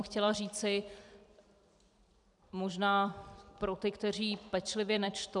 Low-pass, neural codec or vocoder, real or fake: 10.8 kHz; vocoder, 44.1 kHz, 128 mel bands every 256 samples, BigVGAN v2; fake